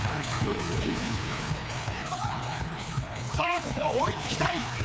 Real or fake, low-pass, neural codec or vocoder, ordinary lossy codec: fake; none; codec, 16 kHz, 2 kbps, FreqCodec, larger model; none